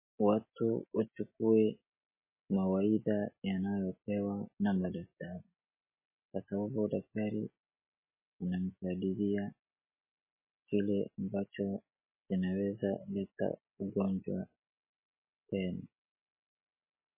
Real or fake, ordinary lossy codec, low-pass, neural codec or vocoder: real; MP3, 16 kbps; 3.6 kHz; none